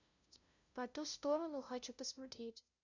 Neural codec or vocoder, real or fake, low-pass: codec, 16 kHz, 0.5 kbps, FunCodec, trained on LibriTTS, 25 frames a second; fake; 7.2 kHz